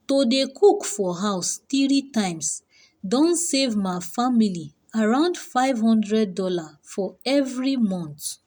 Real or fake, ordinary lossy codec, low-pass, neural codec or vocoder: real; none; none; none